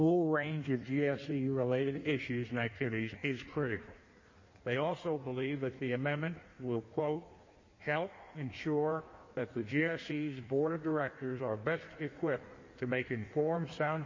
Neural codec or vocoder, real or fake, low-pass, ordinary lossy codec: codec, 16 kHz in and 24 kHz out, 1.1 kbps, FireRedTTS-2 codec; fake; 7.2 kHz; MP3, 32 kbps